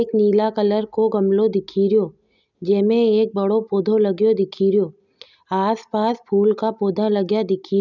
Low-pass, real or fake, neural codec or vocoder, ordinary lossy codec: 7.2 kHz; real; none; none